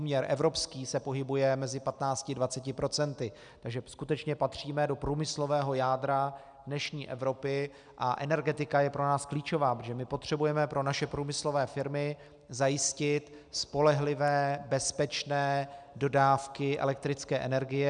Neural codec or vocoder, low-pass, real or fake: none; 9.9 kHz; real